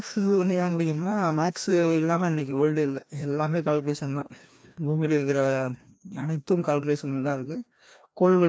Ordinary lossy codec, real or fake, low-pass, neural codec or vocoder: none; fake; none; codec, 16 kHz, 1 kbps, FreqCodec, larger model